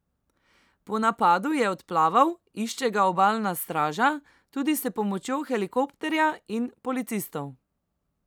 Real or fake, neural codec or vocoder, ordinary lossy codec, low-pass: real; none; none; none